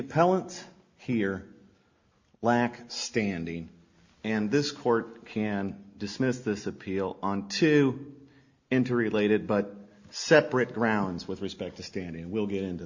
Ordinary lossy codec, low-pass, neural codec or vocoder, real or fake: Opus, 64 kbps; 7.2 kHz; none; real